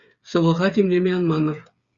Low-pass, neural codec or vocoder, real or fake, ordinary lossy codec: 7.2 kHz; codec, 16 kHz, 8 kbps, FreqCodec, smaller model; fake; Opus, 64 kbps